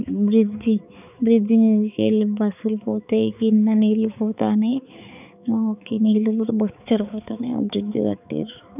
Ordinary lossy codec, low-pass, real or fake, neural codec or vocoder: none; 3.6 kHz; fake; codec, 16 kHz, 4 kbps, X-Codec, HuBERT features, trained on balanced general audio